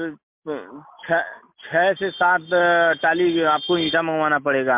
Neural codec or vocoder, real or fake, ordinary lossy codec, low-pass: none; real; MP3, 32 kbps; 3.6 kHz